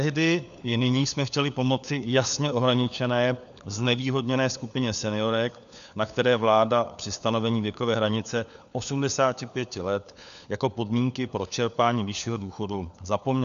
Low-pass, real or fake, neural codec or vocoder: 7.2 kHz; fake; codec, 16 kHz, 4 kbps, FunCodec, trained on LibriTTS, 50 frames a second